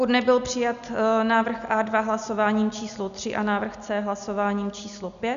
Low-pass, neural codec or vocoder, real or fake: 7.2 kHz; none; real